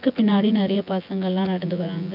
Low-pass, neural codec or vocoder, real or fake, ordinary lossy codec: 5.4 kHz; vocoder, 24 kHz, 100 mel bands, Vocos; fake; none